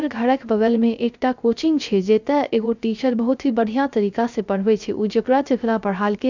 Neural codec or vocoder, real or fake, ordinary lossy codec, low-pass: codec, 16 kHz, 0.3 kbps, FocalCodec; fake; none; 7.2 kHz